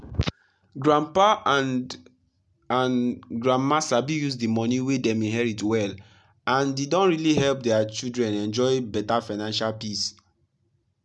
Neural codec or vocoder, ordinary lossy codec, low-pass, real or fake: none; none; none; real